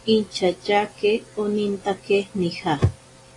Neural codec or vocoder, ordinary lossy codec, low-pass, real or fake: none; AAC, 32 kbps; 10.8 kHz; real